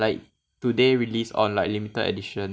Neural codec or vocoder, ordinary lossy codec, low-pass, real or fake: none; none; none; real